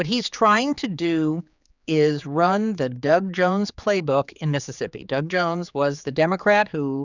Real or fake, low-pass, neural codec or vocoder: fake; 7.2 kHz; codec, 16 kHz, 4 kbps, X-Codec, HuBERT features, trained on general audio